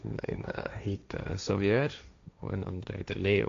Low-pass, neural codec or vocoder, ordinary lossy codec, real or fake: 7.2 kHz; codec, 16 kHz, 1.1 kbps, Voila-Tokenizer; AAC, 64 kbps; fake